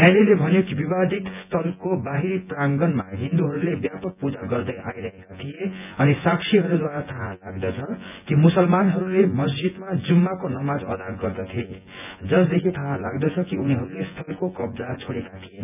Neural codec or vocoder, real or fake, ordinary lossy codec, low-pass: vocoder, 24 kHz, 100 mel bands, Vocos; fake; none; 3.6 kHz